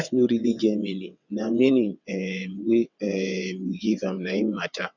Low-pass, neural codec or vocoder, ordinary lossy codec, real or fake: 7.2 kHz; vocoder, 22.05 kHz, 80 mel bands, WaveNeXt; none; fake